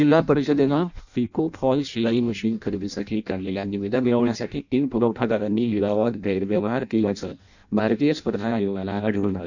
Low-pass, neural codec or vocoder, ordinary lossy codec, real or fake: 7.2 kHz; codec, 16 kHz in and 24 kHz out, 0.6 kbps, FireRedTTS-2 codec; none; fake